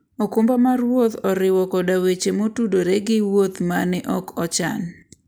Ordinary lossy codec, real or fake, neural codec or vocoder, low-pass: none; real; none; none